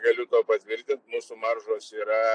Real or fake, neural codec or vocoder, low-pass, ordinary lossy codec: real; none; 9.9 kHz; AAC, 64 kbps